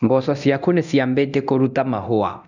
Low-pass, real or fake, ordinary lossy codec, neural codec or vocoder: 7.2 kHz; fake; none; codec, 24 kHz, 0.9 kbps, DualCodec